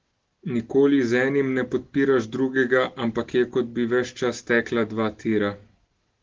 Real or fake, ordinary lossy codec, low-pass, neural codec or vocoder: real; Opus, 16 kbps; 7.2 kHz; none